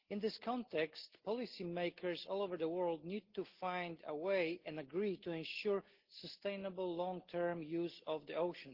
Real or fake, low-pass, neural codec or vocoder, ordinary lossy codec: real; 5.4 kHz; none; Opus, 16 kbps